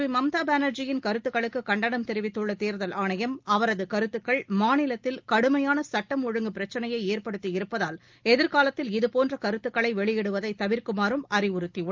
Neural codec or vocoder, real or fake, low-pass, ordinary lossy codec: none; real; 7.2 kHz; Opus, 24 kbps